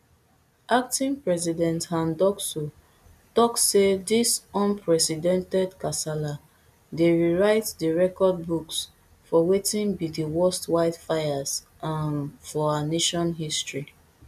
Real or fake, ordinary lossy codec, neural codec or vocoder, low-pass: real; none; none; 14.4 kHz